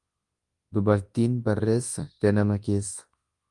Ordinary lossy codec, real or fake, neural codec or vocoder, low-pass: Opus, 24 kbps; fake; codec, 24 kHz, 0.9 kbps, WavTokenizer, large speech release; 10.8 kHz